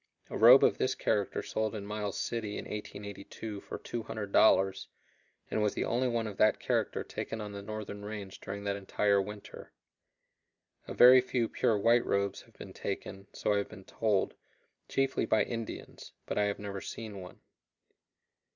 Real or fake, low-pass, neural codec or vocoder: real; 7.2 kHz; none